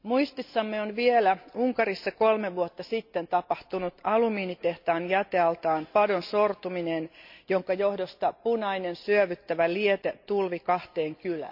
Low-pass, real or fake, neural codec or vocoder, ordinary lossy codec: 5.4 kHz; real; none; MP3, 48 kbps